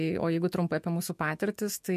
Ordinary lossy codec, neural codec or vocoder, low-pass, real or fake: MP3, 64 kbps; autoencoder, 48 kHz, 128 numbers a frame, DAC-VAE, trained on Japanese speech; 14.4 kHz; fake